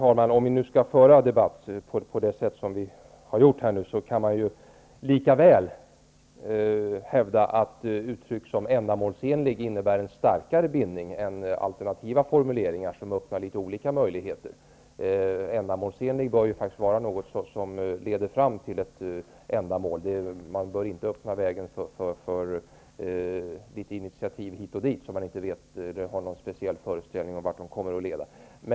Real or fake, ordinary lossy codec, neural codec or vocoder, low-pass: real; none; none; none